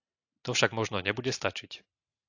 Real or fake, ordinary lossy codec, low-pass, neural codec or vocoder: real; AAC, 48 kbps; 7.2 kHz; none